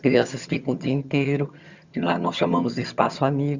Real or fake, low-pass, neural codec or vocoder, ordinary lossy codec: fake; 7.2 kHz; vocoder, 22.05 kHz, 80 mel bands, HiFi-GAN; Opus, 64 kbps